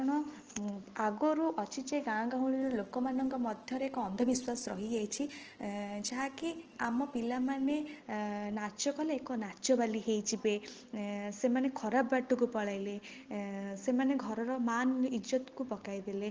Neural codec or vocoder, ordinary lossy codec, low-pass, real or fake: none; Opus, 16 kbps; 7.2 kHz; real